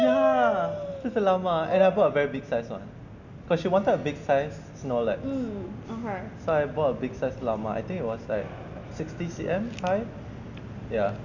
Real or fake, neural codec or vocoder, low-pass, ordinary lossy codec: real; none; 7.2 kHz; none